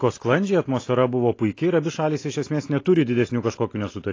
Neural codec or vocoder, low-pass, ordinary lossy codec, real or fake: none; 7.2 kHz; AAC, 32 kbps; real